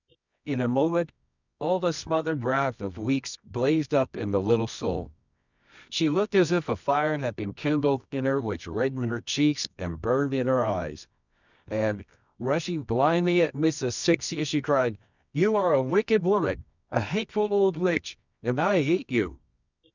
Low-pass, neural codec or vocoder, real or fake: 7.2 kHz; codec, 24 kHz, 0.9 kbps, WavTokenizer, medium music audio release; fake